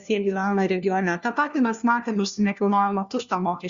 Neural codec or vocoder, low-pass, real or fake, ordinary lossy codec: codec, 16 kHz, 1 kbps, FunCodec, trained on LibriTTS, 50 frames a second; 7.2 kHz; fake; Opus, 64 kbps